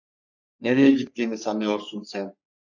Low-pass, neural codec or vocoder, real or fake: 7.2 kHz; codec, 44.1 kHz, 3.4 kbps, Pupu-Codec; fake